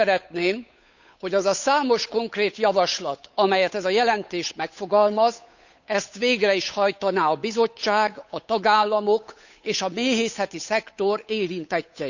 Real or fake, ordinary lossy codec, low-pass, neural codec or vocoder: fake; none; 7.2 kHz; codec, 16 kHz, 8 kbps, FunCodec, trained on Chinese and English, 25 frames a second